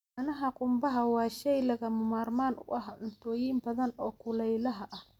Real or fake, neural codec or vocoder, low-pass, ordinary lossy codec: real; none; 19.8 kHz; none